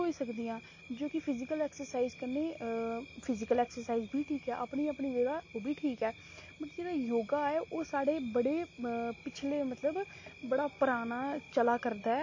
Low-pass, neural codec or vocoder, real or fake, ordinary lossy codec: 7.2 kHz; none; real; MP3, 32 kbps